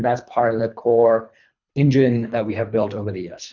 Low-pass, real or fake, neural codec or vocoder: 7.2 kHz; fake; codec, 24 kHz, 3 kbps, HILCodec